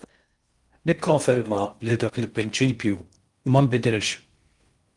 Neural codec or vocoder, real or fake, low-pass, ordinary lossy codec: codec, 16 kHz in and 24 kHz out, 0.6 kbps, FocalCodec, streaming, 2048 codes; fake; 10.8 kHz; Opus, 32 kbps